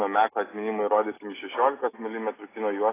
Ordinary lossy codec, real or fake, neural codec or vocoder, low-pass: AAC, 16 kbps; real; none; 3.6 kHz